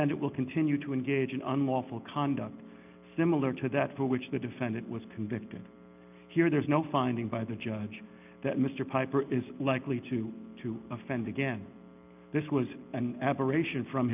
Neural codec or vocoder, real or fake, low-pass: none; real; 3.6 kHz